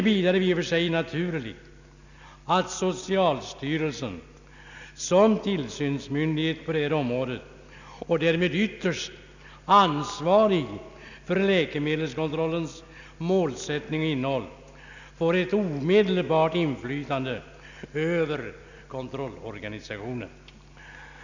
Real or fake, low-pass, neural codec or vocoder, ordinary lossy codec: real; 7.2 kHz; none; none